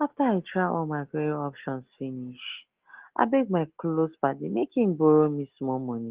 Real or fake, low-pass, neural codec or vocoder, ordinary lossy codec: real; 3.6 kHz; none; Opus, 16 kbps